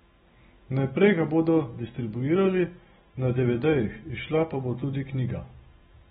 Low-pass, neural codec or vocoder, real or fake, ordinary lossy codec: 19.8 kHz; none; real; AAC, 16 kbps